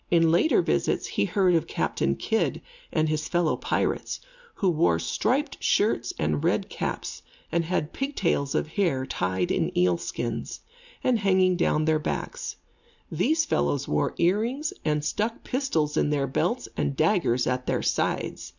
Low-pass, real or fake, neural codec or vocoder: 7.2 kHz; real; none